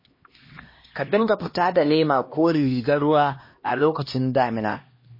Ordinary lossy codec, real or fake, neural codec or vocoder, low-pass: MP3, 24 kbps; fake; codec, 16 kHz, 1 kbps, X-Codec, HuBERT features, trained on LibriSpeech; 5.4 kHz